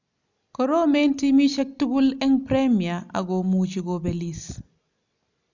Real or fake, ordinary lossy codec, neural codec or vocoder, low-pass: real; none; none; 7.2 kHz